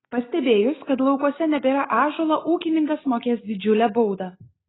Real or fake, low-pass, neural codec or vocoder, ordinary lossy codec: real; 7.2 kHz; none; AAC, 16 kbps